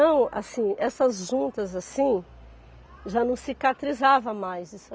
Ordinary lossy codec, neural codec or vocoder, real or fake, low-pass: none; none; real; none